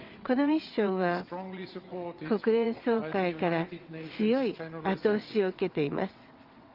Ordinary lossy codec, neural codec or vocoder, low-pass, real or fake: Opus, 32 kbps; vocoder, 22.05 kHz, 80 mel bands, WaveNeXt; 5.4 kHz; fake